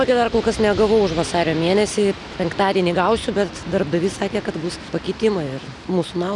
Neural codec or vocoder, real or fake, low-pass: none; real; 10.8 kHz